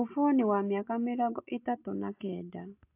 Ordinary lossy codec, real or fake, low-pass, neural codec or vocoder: AAC, 32 kbps; real; 3.6 kHz; none